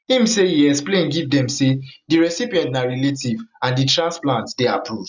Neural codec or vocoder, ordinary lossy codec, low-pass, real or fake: none; none; 7.2 kHz; real